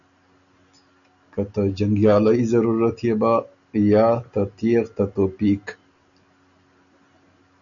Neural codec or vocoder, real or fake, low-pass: none; real; 7.2 kHz